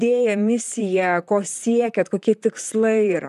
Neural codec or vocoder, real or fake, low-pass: vocoder, 44.1 kHz, 128 mel bands, Pupu-Vocoder; fake; 14.4 kHz